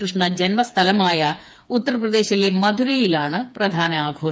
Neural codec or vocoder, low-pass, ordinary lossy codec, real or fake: codec, 16 kHz, 4 kbps, FreqCodec, smaller model; none; none; fake